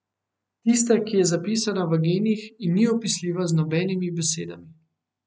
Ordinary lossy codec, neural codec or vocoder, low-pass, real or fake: none; none; none; real